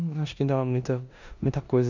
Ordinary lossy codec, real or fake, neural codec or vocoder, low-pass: none; fake; codec, 16 kHz in and 24 kHz out, 0.9 kbps, LongCat-Audio-Codec, four codebook decoder; 7.2 kHz